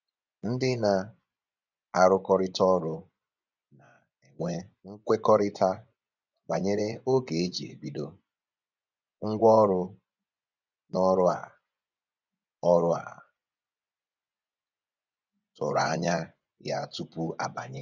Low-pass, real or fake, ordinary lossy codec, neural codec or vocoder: 7.2 kHz; fake; none; vocoder, 24 kHz, 100 mel bands, Vocos